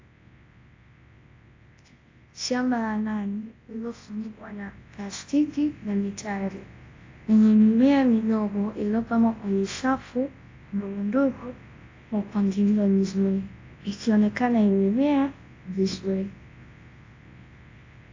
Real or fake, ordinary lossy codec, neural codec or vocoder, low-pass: fake; AAC, 32 kbps; codec, 24 kHz, 0.9 kbps, WavTokenizer, large speech release; 7.2 kHz